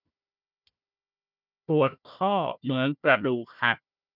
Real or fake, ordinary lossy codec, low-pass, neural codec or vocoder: fake; none; 5.4 kHz; codec, 16 kHz, 1 kbps, FunCodec, trained on Chinese and English, 50 frames a second